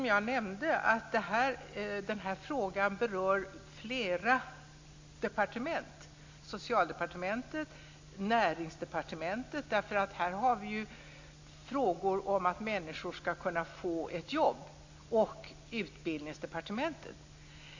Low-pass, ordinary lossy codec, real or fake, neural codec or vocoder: 7.2 kHz; AAC, 48 kbps; real; none